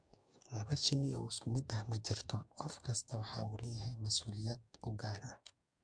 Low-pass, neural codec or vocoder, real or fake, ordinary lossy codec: 9.9 kHz; codec, 44.1 kHz, 2.6 kbps, DAC; fake; MP3, 64 kbps